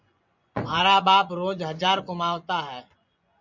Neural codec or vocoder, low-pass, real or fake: vocoder, 24 kHz, 100 mel bands, Vocos; 7.2 kHz; fake